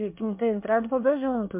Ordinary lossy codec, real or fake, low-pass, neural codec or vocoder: MP3, 32 kbps; fake; 3.6 kHz; codec, 24 kHz, 1 kbps, SNAC